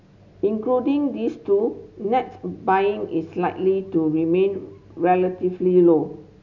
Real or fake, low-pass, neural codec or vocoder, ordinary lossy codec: real; 7.2 kHz; none; none